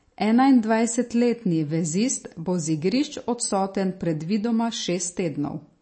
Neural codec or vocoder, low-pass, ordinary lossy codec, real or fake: none; 9.9 kHz; MP3, 32 kbps; real